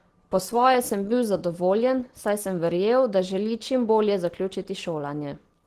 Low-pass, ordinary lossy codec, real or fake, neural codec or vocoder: 14.4 kHz; Opus, 16 kbps; real; none